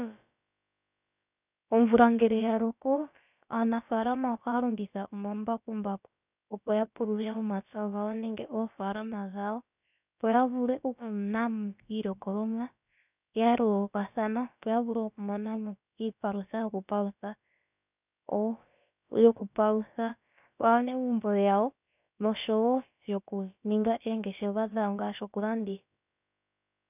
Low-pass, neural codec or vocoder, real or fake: 3.6 kHz; codec, 16 kHz, about 1 kbps, DyCAST, with the encoder's durations; fake